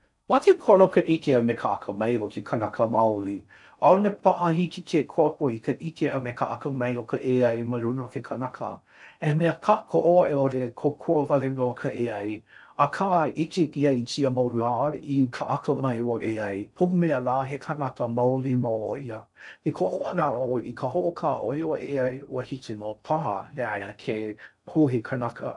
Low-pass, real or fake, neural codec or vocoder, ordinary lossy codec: 10.8 kHz; fake; codec, 16 kHz in and 24 kHz out, 0.6 kbps, FocalCodec, streaming, 4096 codes; none